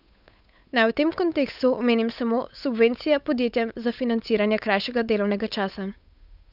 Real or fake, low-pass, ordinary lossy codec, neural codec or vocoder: fake; 5.4 kHz; none; codec, 16 kHz, 8 kbps, FunCodec, trained on Chinese and English, 25 frames a second